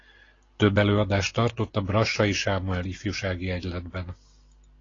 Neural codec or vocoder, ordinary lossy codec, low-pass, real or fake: none; AAC, 32 kbps; 7.2 kHz; real